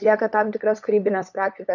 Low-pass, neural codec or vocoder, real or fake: 7.2 kHz; codec, 16 kHz, 8 kbps, FunCodec, trained on LibriTTS, 25 frames a second; fake